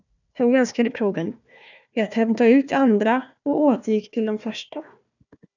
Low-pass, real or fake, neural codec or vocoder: 7.2 kHz; fake; codec, 16 kHz, 1 kbps, FunCodec, trained on Chinese and English, 50 frames a second